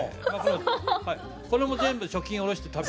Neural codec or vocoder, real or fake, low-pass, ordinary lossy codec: none; real; none; none